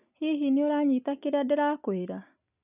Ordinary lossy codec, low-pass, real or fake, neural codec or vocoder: none; 3.6 kHz; real; none